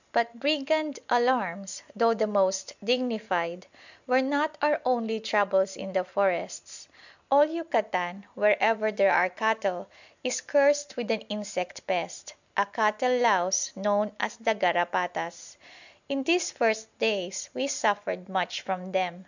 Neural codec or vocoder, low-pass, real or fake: none; 7.2 kHz; real